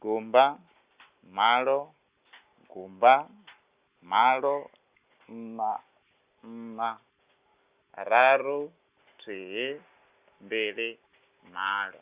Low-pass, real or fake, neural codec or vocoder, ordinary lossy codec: 3.6 kHz; real; none; Opus, 64 kbps